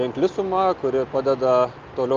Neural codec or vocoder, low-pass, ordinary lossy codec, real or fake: none; 7.2 kHz; Opus, 24 kbps; real